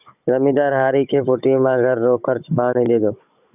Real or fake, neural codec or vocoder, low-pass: fake; codec, 16 kHz, 16 kbps, FunCodec, trained on Chinese and English, 50 frames a second; 3.6 kHz